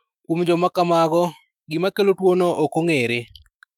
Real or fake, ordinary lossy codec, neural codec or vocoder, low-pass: fake; none; autoencoder, 48 kHz, 128 numbers a frame, DAC-VAE, trained on Japanese speech; 19.8 kHz